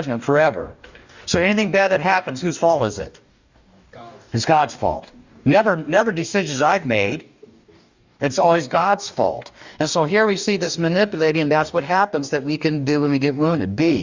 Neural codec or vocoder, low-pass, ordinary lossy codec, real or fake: codec, 44.1 kHz, 2.6 kbps, DAC; 7.2 kHz; Opus, 64 kbps; fake